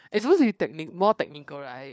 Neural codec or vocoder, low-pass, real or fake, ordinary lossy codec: codec, 16 kHz, 4 kbps, FunCodec, trained on LibriTTS, 50 frames a second; none; fake; none